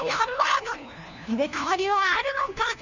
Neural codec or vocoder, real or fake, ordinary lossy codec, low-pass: codec, 16 kHz, 1 kbps, FunCodec, trained on LibriTTS, 50 frames a second; fake; none; 7.2 kHz